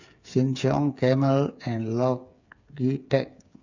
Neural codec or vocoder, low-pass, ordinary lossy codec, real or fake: codec, 16 kHz, 8 kbps, FreqCodec, smaller model; 7.2 kHz; none; fake